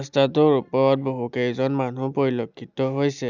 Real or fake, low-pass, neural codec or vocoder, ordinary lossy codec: real; 7.2 kHz; none; none